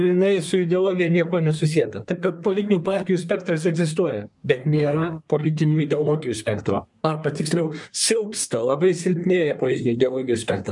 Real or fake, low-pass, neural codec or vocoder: fake; 10.8 kHz; codec, 24 kHz, 1 kbps, SNAC